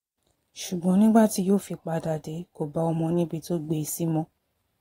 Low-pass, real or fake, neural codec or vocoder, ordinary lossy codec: 19.8 kHz; fake; vocoder, 44.1 kHz, 128 mel bands every 512 samples, BigVGAN v2; AAC, 48 kbps